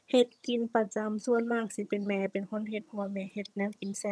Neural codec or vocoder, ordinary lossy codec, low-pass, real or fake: vocoder, 22.05 kHz, 80 mel bands, HiFi-GAN; none; none; fake